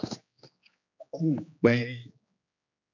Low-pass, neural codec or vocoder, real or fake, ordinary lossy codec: 7.2 kHz; codec, 16 kHz, 2 kbps, X-Codec, HuBERT features, trained on general audio; fake; MP3, 64 kbps